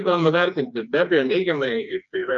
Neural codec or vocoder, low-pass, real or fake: codec, 16 kHz, 2 kbps, FreqCodec, smaller model; 7.2 kHz; fake